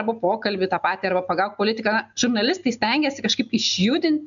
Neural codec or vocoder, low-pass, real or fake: none; 7.2 kHz; real